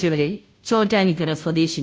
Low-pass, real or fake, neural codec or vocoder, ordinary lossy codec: none; fake; codec, 16 kHz, 0.5 kbps, FunCodec, trained on Chinese and English, 25 frames a second; none